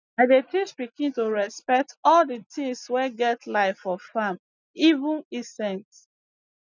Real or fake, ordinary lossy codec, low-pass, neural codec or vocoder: real; none; 7.2 kHz; none